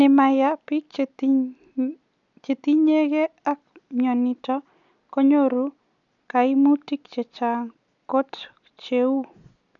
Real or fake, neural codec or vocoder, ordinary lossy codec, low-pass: real; none; MP3, 96 kbps; 7.2 kHz